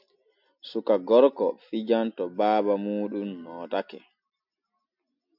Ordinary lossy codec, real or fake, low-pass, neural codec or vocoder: MP3, 48 kbps; real; 5.4 kHz; none